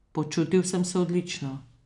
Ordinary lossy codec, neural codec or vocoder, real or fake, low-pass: none; none; real; 10.8 kHz